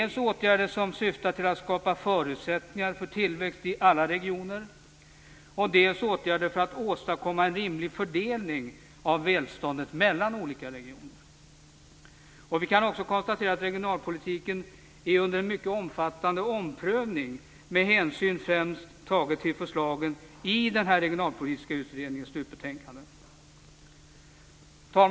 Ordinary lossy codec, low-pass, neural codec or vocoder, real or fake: none; none; none; real